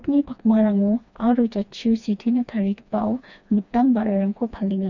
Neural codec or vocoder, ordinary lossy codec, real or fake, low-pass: codec, 16 kHz, 2 kbps, FreqCodec, smaller model; none; fake; 7.2 kHz